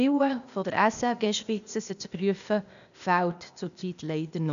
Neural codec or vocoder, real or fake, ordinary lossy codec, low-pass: codec, 16 kHz, 0.8 kbps, ZipCodec; fake; none; 7.2 kHz